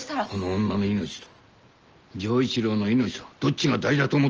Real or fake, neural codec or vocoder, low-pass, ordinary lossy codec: real; none; 7.2 kHz; Opus, 24 kbps